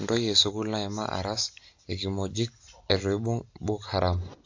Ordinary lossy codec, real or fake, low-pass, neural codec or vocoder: AAC, 48 kbps; real; 7.2 kHz; none